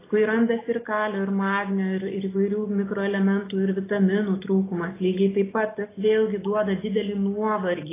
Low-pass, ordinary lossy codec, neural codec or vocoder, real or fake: 3.6 kHz; AAC, 16 kbps; none; real